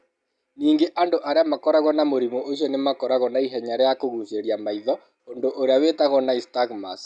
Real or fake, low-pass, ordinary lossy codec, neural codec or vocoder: real; 9.9 kHz; none; none